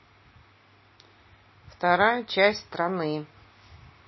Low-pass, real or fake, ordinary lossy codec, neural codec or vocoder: 7.2 kHz; real; MP3, 24 kbps; none